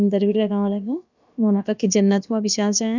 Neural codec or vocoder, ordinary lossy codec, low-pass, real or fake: codec, 16 kHz, about 1 kbps, DyCAST, with the encoder's durations; none; 7.2 kHz; fake